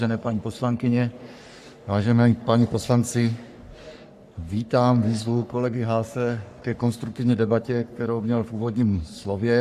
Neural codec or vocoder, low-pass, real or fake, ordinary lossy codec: codec, 44.1 kHz, 3.4 kbps, Pupu-Codec; 14.4 kHz; fake; AAC, 96 kbps